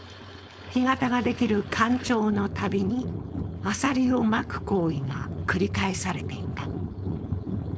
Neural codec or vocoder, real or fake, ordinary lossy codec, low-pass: codec, 16 kHz, 4.8 kbps, FACodec; fake; none; none